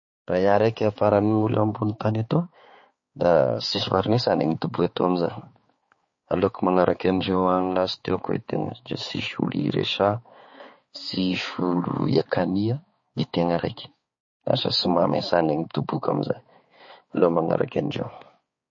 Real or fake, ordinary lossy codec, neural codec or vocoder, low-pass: fake; MP3, 32 kbps; codec, 16 kHz, 4 kbps, X-Codec, HuBERT features, trained on balanced general audio; 7.2 kHz